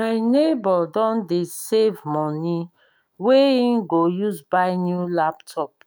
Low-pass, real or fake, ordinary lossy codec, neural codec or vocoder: none; fake; none; autoencoder, 48 kHz, 128 numbers a frame, DAC-VAE, trained on Japanese speech